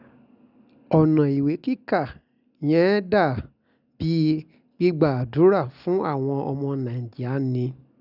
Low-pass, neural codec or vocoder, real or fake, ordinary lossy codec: 5.4 kHz; none; real; none